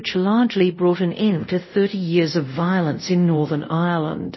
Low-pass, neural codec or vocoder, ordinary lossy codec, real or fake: 7.2 kHz; codec, 24 kHz, 0.5 kbps, DualCodec; MP3, 24 kbps; fake